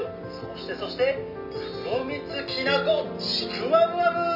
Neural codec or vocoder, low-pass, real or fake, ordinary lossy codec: none; 5.4 kHz; real; none